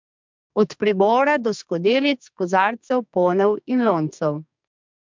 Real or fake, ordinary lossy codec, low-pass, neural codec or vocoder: fake; MP3, 64 kbps; 7.2 kHz; codec, 44.1 kHz, 2.6 kbps, SNAC